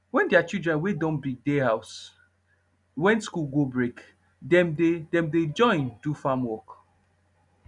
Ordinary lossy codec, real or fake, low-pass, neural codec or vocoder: none; real; 10.8 kHz; none